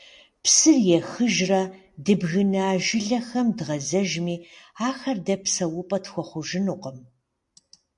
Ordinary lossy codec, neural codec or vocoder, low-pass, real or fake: AAC, 64 kbps; none; 10.8 kHz; real